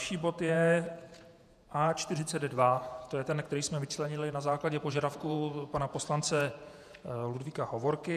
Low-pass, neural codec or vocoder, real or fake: 14.4 kHz; vocoder, 48 kHz, 128 mel bands, Vocos; fake